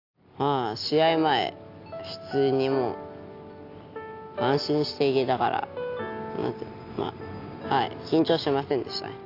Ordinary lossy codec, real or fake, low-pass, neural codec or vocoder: AAC, 32 kbps; real; 5.4 kHz; none